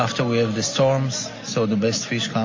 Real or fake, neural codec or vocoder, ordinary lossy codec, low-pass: real; none; MP3, 32 kbps; 7.2 kHz